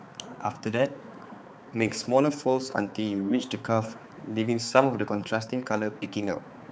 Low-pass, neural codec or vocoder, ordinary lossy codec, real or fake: none; codec, 16 kHz, 4 kbps, X-Codec, HuBERT features, trained on balanced general audio; none; fake